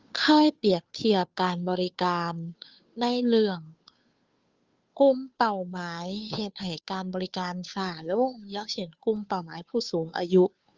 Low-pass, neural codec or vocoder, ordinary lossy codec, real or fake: 7.2 kHz; codec, 16 kHz, 2 kbps, FunCodec, trained on Chinese and English, 25 frames a second; Opus, 32 kbps; fake